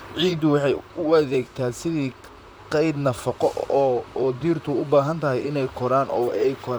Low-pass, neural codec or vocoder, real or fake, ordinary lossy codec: none; vocoder, 44.1 kHz, 128 mel bands, Pupu-Vocoder; fake; none